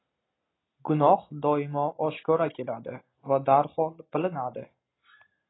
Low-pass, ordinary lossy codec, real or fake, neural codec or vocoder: 7.2 kHz; AAC, 16 kbps; real; none